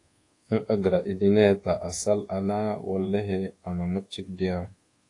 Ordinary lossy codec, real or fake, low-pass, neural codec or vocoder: AAC, 48 kbps; fake; 10.8 kHz; codec, 24 kHz, 1.2 kbps, DualCodec